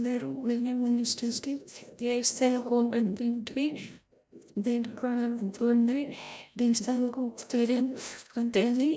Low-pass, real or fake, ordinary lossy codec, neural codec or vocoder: none; fake; none; codec, 16 kHz, 0.5 kbps, FreqCodec, larger model